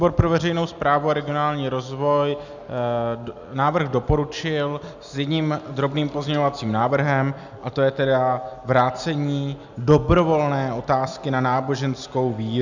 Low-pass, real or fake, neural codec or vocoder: 7.2 kHz; real; none